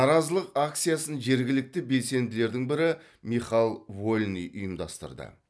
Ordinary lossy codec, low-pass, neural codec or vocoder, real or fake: none; none; none; real